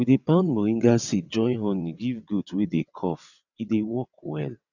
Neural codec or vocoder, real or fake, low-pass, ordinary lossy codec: vocoder, 22.05 kHz, 80 mel bands, WaveNeXt; fake; 7.2 kHz; none